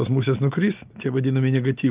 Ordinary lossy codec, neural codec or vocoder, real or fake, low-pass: Opus, 32 kbps; none; real; 3.6 kHz